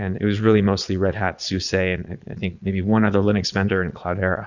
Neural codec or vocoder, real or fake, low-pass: vocoder, 22.05 kHz, 80 mel bands, Vocos; fake; 7.2 kHz